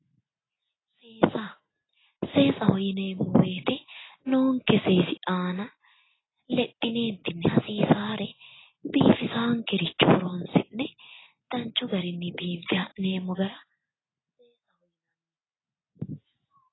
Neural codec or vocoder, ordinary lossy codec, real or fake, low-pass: none; AAC, 16 kbps; real; 7.2 kHz